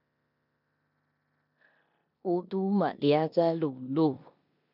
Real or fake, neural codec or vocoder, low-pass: fake; codec, 16 kHz in and 24 kHz out, 0.9 kbps, LongCat-Audio-Codec, four codebook decoder; 5.4 kHz